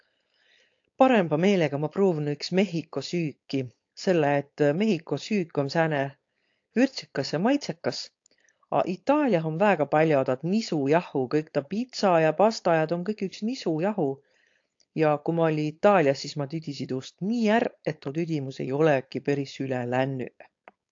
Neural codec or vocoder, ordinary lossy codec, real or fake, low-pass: codec, 16 kHz, 4.8 kbps, FACodec; AAC, 48 kbps; fake; 7.2 kHz